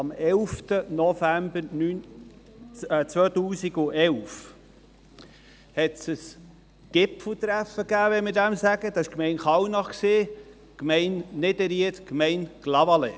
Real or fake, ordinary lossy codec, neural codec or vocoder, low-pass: real; none; none; none